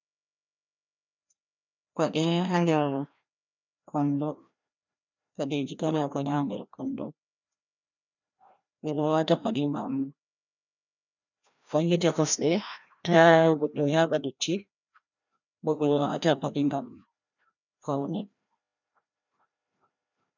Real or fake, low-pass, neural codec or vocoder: fake; 7.2 kHz; codec, 16 kHz, 1 kbps, FreqCodec, larger model